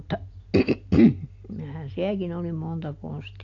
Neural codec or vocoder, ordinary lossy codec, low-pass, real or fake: none; AAC, 48 kbps; 7.2 kHz; real